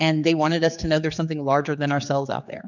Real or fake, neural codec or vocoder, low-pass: fake; codec, 16 kHz, 4 kbps, X-Codec, HuBERT features, trained on general audio; 7.2 kHz